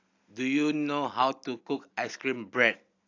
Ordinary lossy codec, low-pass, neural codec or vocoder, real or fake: AAC, 48 kbps; 7.2 kHz; none; real